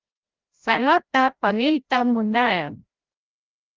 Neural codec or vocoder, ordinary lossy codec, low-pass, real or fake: codec, 16 kHz, 0.5 kbps, FreqCodec, larger model; Opus, 32 kbps; 7.2 kHz; fake